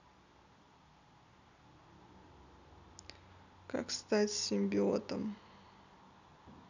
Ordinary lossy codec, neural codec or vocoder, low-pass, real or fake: none; none; 7.2 kHz; real